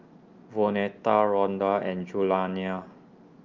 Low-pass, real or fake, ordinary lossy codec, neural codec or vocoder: 7.2 kHz; real; Opus, 24 kbps; none